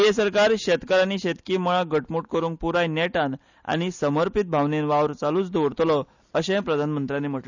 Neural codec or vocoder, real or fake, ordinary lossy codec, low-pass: none; real; none; 7.2 kHz